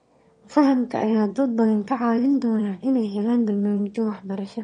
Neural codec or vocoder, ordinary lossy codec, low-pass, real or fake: autoencoder, 22.05 kHz, a latent of 192 numbers a frame, VITS, trained on one speaker; MP3, 48 kbps; 9.9 kHz; fake